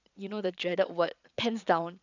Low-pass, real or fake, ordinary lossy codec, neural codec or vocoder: 7.2 kHz; fake; none; vocoder, 22.05 kHz, 80 mel bands, Vocos